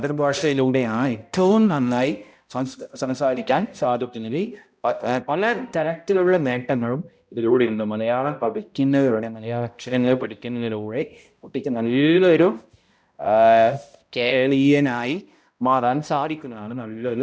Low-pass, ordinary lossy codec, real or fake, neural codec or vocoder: none; none; fake; codec, 16 kHz, 0.5 kbps, X-Codec, HuBERT features, trained on balanced general audio